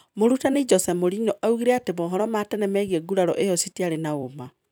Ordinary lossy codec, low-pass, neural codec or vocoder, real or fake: none; none; vocoder, 44.1 kHz, 128 mel bands every 256 samples, BigVGAN v2; fake